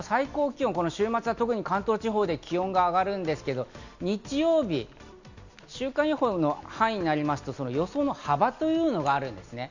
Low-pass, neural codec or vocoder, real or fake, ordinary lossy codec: 7.2 kHz; none; real; none